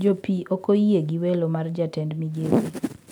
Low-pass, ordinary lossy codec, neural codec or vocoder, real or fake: none; none; none; real